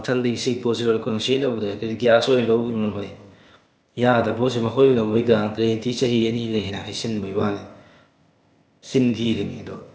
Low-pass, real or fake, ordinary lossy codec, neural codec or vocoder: none; fake; none; codec, 16 kHz, 0.8 kbps, ZipCodec